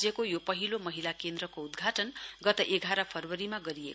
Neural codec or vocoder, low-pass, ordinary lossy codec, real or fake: none; none; none; real